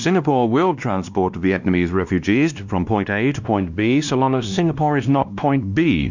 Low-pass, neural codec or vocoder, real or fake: 7.2 kHz; codec, 16 kHz, 1 kbps, X-Codec, WavLM features, trained on Multilingual LibriSpeech; fake